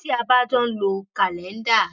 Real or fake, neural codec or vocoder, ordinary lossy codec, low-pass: real; none; none; 7.2 kHz